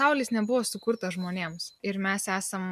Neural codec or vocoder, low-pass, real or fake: none; 14.4 kHz; real